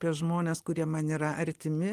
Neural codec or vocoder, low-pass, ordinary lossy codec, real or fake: none; 14.4 kHz; Opus, 24 kbps; real